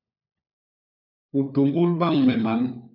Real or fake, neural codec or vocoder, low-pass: fake; codec, 16 kHz, 4 kbps, FunCodec, trained on LibriTTS, 50 frames a second; 5.4 kHz